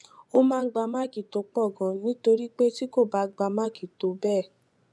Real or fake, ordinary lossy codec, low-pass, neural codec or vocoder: fake; none; none; vocoder, 24 kHz, 100 mel bands, Vocos